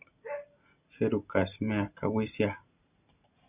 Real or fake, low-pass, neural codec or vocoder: real; 3.6 kHz; none